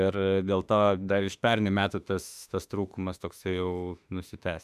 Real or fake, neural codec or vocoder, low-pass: fake; autoencoder, 48 kHz, 32 numbers a frame, DAC-VAE, trained on Japanese speech; 14.4 kHz